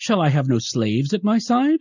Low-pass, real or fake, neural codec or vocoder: 7.2 kHz; real; none